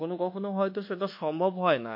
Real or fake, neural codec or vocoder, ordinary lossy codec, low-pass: fake; codec, 24 kHz, 1.2 kbps, DualCodec; MP3, 32 kbps; 5.4 kHz